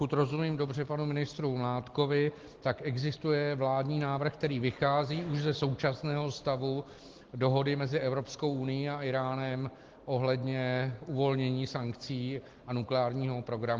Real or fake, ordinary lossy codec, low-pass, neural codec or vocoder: real; Opus, 16 kbps; 7.2 kHz; none